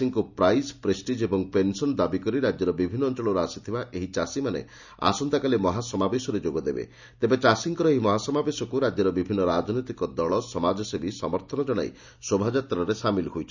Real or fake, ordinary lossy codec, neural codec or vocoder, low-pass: real; none; none; 7.2 kHz